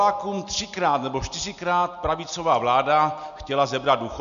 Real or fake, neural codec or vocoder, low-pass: real; none; 7.2 kHz